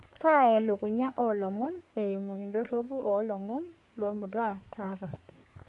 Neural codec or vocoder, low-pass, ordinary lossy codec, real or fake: codec, 24 kHz, 1 kbps, SNAC; 10.8 kHz; none; fake